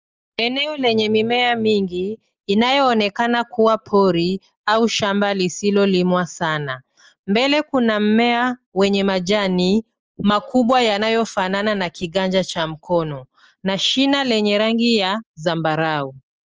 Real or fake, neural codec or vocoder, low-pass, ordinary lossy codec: real; none; 7.2 kHz; Opus, 24 kbps